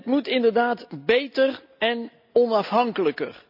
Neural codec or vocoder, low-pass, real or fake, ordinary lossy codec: none; 5.4 kHz; real; none